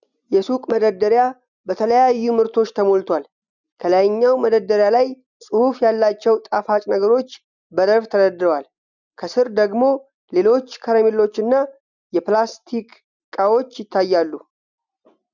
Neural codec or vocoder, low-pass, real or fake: none; 7.2 kHz; real